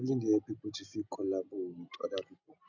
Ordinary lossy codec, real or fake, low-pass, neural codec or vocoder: none; real; 7.2 kHz; none